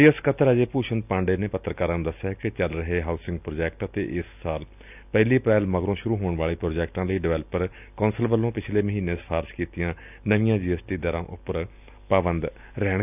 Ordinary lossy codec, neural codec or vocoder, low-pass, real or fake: none; none; 3.6 kHz; real